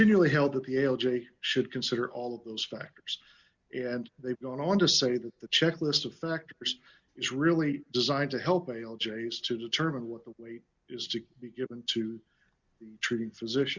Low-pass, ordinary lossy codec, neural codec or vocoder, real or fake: 7.2 kHz; Opus, 64 kbps; none; real